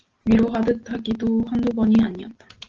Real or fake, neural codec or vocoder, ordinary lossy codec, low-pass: real; none; Opus, 16 kbps; 7.2 kHz